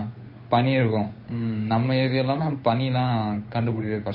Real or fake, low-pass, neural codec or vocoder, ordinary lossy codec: fake; 5.4 kHz; codec, 16 kHz, 8 kbps, FunCodec, trained on Chinese and English, 25 frames a second; MP3, 24 kbps